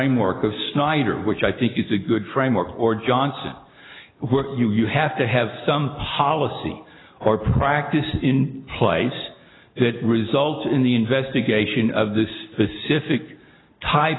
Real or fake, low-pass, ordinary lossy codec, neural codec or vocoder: real; 7.2 kHz; AAC, 16 kbps; none